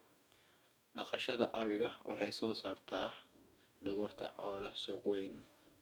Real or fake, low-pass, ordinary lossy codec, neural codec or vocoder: fake; none; none; codec, 44.1 kHz, 2.6 kbps, DAC